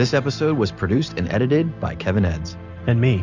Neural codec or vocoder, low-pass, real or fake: none; 7.2 kHz; real